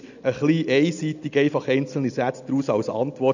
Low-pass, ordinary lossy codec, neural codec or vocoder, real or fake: 7.2 kHz; none; none; real